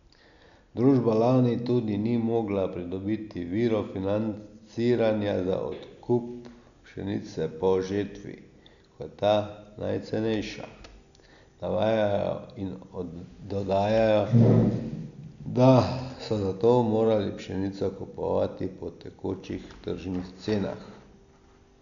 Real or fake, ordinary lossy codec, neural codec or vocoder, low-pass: real; none; none; 7.2 kHz